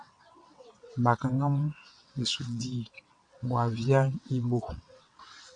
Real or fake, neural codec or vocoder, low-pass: fake; vocoder, 22.05 kHz, 80 mel bands, WaveNeXt; 9.9 kHz